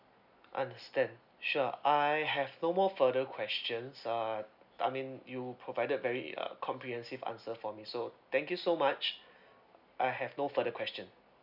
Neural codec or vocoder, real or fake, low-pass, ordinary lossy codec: none; real; 5.4 kHz; none